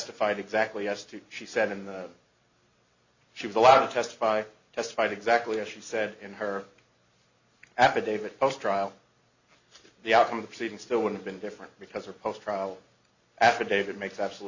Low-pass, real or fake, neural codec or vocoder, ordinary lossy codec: 7.2 kHz; real; none; Opus, 64 kbps